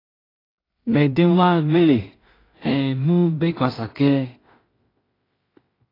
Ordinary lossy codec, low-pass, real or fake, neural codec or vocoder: AAC, 24 kbps; 5.4 kHz; fake; codec, 16 kHz in and 24 kHz out, 0.4 kbps, LongCat-Audio-Codec, two codebook decoder